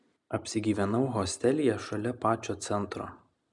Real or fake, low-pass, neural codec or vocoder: real; 10.8 kHz; none